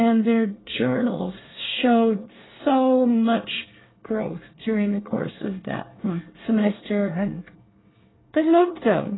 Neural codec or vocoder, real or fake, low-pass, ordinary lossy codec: codec, 24 kHz, 1 kbps, SNAC; fake; 7.2 kHz; AAC, 16 kbps